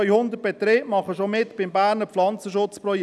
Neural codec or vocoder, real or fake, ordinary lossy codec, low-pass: none; real; none; none